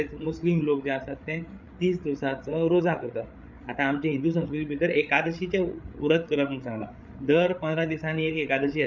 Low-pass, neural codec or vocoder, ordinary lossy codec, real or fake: 7.2 kHz; codec, 16 kHz, 8 kbps, FreqCodec, larger model; none; fake